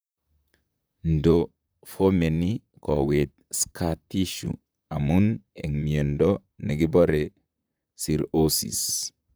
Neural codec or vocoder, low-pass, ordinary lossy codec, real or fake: vocoder, 44.1 kHz, 128 mel bands, Pupu-Vocoder; none; none; fake